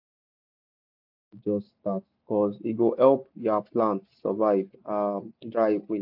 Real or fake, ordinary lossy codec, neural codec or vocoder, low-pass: real; AAC, 48 kbps; none; 5.4 kHz